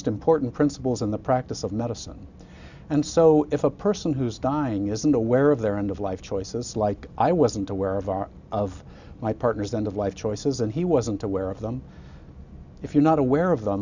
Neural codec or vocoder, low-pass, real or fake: none; 7.2 kHz; real